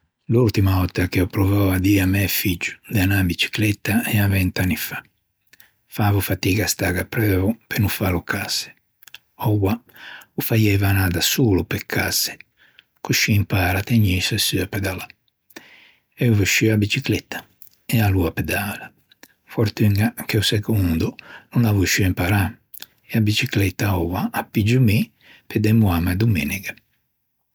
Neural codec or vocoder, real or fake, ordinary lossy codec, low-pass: none; real; none; none